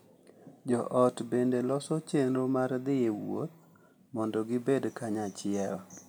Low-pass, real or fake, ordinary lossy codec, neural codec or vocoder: none; real; none; none